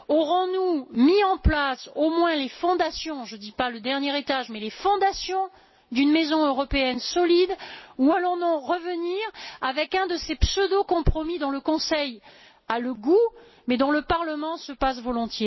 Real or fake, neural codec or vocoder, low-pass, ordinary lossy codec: real; none; 7.2 kHz; MP3, 24 kbps